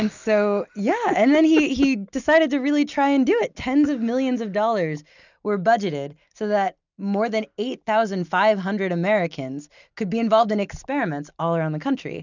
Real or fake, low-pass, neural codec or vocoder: real; 7.2 kHz; none